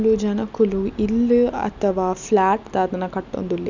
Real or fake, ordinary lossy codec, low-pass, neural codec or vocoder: real; none; 7.2 kHz; none